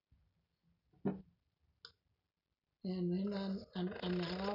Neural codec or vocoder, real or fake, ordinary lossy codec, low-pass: none; real; none; 5.4 kHz